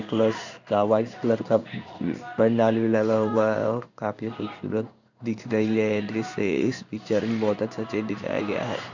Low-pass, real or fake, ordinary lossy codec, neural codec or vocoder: 7.2 kHz; fake; none; codec, 16 kHz in and 24 kHz out, 1 kbps, XY-Tokenizer